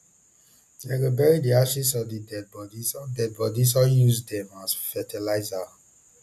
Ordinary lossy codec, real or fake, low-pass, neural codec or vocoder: none; real; 14.4 kHz; none